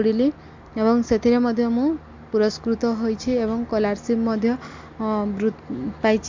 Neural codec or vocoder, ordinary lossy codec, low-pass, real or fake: none; MP3, 48 kbps; 7.2 kHz; real